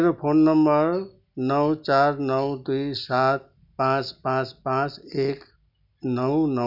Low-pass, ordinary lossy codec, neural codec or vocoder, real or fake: 5.4 kHz; none; none; real